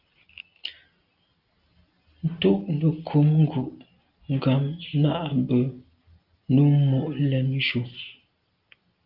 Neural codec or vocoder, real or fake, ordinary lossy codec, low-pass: none; real; Opus, 32 kbps; 5.4 kHz